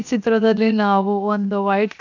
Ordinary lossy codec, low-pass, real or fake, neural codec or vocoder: none; 7.2 kHz; fake; codec, 16 kHz, 0.7 kbps, FocalCodec